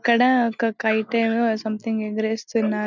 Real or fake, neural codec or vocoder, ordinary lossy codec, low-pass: real; none; none; 7.2 kHz